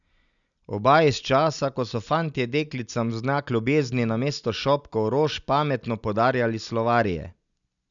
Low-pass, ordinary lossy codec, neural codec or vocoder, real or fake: 7.2 kHz; none; none; real